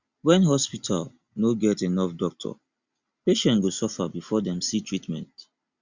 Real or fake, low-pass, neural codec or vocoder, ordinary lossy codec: real; 7.2 kHz; none; Opus, 64 kbps